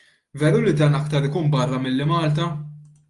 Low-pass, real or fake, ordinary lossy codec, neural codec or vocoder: 10.8 kHz; real; Opus, 32 kbps; none